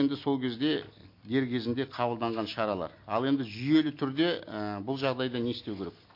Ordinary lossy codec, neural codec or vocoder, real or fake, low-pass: MP3, 32 kbps; none; real; 5.4 kHz